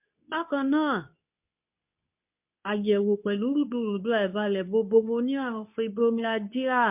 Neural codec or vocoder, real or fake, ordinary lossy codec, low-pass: codec, 24 kHz, 0.9 kbps, WavTokenizer, medium speech release version 2; fake; MP3, 32 kbps; 3.6 kHz